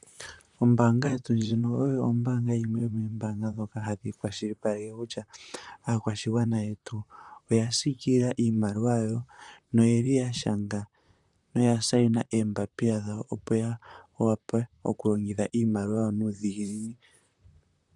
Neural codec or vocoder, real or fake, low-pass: vocoder, 44.1 kHz, 128 mel bands, Pupu-Vocoder; fake; 10.8 kHz